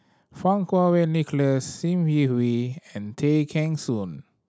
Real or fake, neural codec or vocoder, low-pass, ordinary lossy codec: real; none; none; none